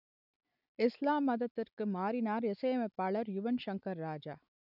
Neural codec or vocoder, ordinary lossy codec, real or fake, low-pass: none; none; real; 5.4 kHz